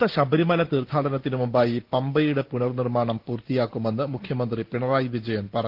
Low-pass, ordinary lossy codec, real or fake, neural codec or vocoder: 5.4 kHz; Opus, 16 kbps; real; none